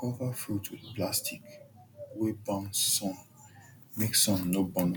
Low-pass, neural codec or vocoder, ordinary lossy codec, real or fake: none; none; none; real